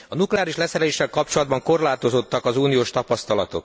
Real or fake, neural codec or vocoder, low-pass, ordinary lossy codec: real; none; none; none